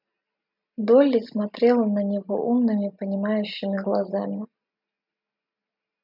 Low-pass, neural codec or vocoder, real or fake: 5.4 kHz; none; real